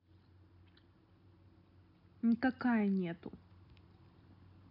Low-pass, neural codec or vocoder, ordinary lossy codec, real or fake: 5.4 kHz; none; none; real